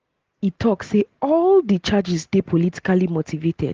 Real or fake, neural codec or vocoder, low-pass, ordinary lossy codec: real; none; 7.2 kHz; Opus, 16 kbps